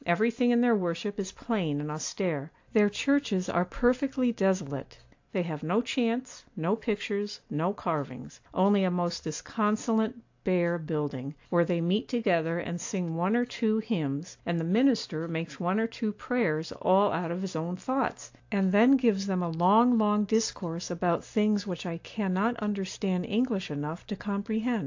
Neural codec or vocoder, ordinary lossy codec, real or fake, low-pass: none; AAC, 48 kbps; real; 7.2 kHz